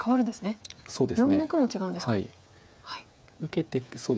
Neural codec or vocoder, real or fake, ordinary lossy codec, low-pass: codec, 16 kHz, 8 kbps, FreqCodec, smaller model; fake; none; none